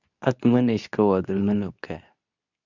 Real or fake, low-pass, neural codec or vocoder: fake; 7.2 kHz; codec, 24 kHz, 0.9 kbps, WavTokenizer, medium speech release version 2